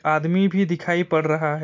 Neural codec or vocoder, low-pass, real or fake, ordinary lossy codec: none; 7.2 kHz; real; MP3, 48 kbps